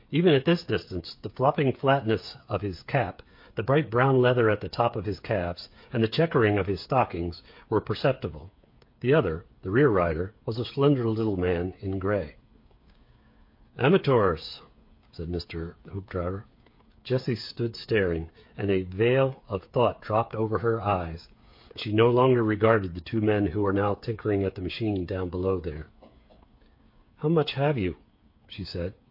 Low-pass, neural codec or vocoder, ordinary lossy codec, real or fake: 5.4 kHz; codec, 16 kHz, 8 kbps, FreqCodec, smaller model; MP3, 32 kbps; fake